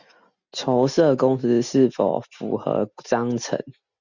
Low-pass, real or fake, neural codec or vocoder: 7.2 kHz; real; none